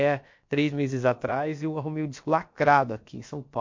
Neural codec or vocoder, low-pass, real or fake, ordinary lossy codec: codec, 16 kHz, about 1 kbps, DyCAST, with the encoder's durations; 7.2 kHz; fake; MP3, 48 kbps